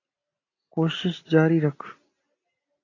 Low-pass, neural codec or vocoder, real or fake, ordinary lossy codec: 7.2 kHz; none; real; AAC, 32 kbps